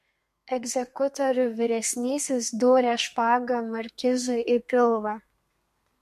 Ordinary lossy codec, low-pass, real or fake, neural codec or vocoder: MP3, 64 kbps; 14.4 kHz; fake; codec, 32 kHz, 1.9 kbps, SNAC